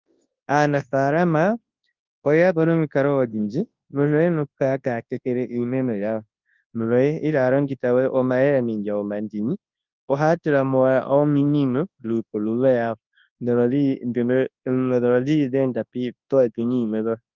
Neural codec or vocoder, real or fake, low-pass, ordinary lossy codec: codec, 24 kHz, 0.9 kbps, WavTokenizer, large speech release; fake; 7.2 kHz; Opus, 24 kbps